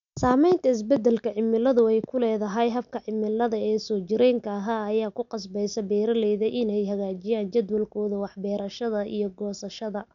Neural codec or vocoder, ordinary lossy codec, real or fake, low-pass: none; none; real; 7.2 kHz